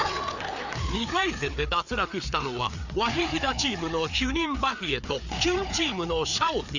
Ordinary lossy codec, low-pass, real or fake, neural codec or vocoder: none; 7.2 kHz; fake; codec, 16 kHz, 4 kbps, FreqCodec, larger model